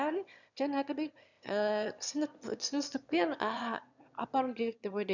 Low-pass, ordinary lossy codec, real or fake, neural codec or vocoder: 7.2 kHz; none; fake; autoencoder, 22.05 kHz, a latent of 192 numbers a frame, VITS, trained on one speaker